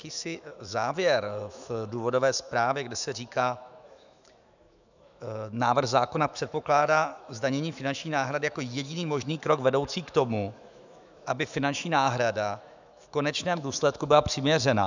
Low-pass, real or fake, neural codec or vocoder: 7.2 kHz; fake; autoencoder, 48 kHz, 128 numbers a frame, DAC-VAE, trained on Japanese speech